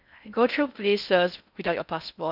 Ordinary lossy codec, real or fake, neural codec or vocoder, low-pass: none; fake; codec, 16 kHz in and 24 kHz out, 0.6 kbps, FocalCodec, streaming, 4096 codes; 5.4 kHz